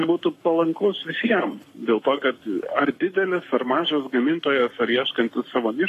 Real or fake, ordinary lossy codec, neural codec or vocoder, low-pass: fake; AAC, 48 kbps; codec, 44.1 kHz, 7.8 kbps, Pupu-Codec; 14.4 kHz